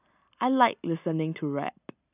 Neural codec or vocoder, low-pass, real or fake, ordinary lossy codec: none; 3.6 kHz; real; none